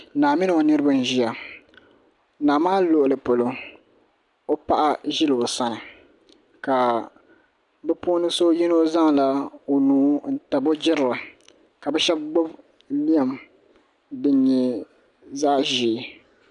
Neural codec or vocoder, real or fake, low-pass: none; real; 10.8 kHz